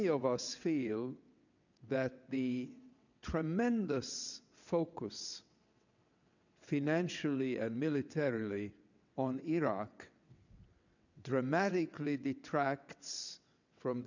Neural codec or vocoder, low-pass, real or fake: vocoder, 22.05 kHz, 80 mel bands, WaveNeXt; 7.2 kHz; fake